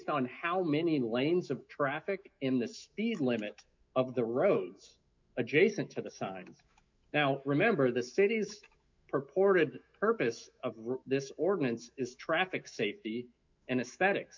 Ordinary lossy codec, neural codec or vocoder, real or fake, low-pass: MP3, 64 kbps; autoencoder, 48 kHz, 128 numbers a frame, DAC-VAE, trained on Japanese speech; fake; 7.2 kHz